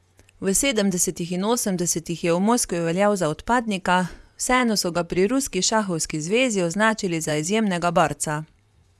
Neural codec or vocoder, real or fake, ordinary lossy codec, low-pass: vocoder, 24 kHz, 100 mel bands, Vocos; fake; none; none